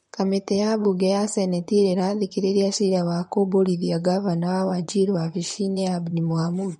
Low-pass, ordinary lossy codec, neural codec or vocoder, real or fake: 19.8 kHz; MP3, 48 kbps; vocoder, 44.1 kHz, 128 mel bands, Pupu-Vocoder; fake